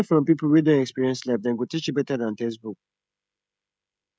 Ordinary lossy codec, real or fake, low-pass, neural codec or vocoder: none; fake; none; codec, 16 kHz, 16 kbps, FreqCodec, smaller model